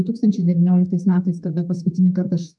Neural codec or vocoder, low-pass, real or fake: codec, 32 kHz, 1.9 kbps, SNAC; 10.8 kHz; fake